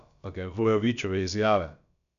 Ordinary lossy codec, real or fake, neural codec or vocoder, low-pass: none; fake; codec, 16 kHz, about 1 kbps, DyCAST, with the encoder's durations; 7.2 kHz